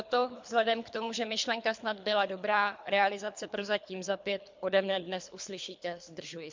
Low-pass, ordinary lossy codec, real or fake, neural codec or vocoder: 7.2 kHz; MP3, 64 kbps; fake; codec, 24 kHz, 3 kbps, HILCodec